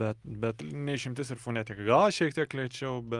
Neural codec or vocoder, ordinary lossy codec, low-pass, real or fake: none; Opus, 32 kbps; 10.8 kHz; real